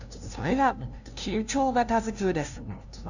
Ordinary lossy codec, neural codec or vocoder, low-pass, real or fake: none; codec, 16 kHz, 0.5 kbps, FunCodec, trained on LibriTTS, 25 frames a second; 7.2 kHz; fake